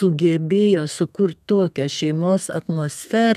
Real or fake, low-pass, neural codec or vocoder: fake; 14.4 kHz; codec, 32 kHz, 1.9 kbps, SNAC